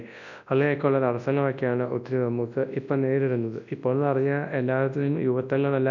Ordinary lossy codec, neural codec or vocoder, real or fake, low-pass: none; codec, 24 kHz, 0.9 kbps, WavTokenizer, large speech release; fake; 7.2 kHz